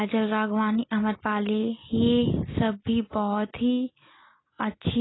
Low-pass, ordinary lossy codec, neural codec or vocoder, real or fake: 7.2 kHz; AAC, 16 kbps; none; real